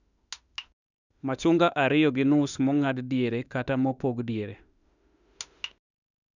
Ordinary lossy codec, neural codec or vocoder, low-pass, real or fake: none; autoencoder, 48 kHz, 32 numbers a frame, DAC-VAE, trained on Japanese speech; 7.2 kHz; fake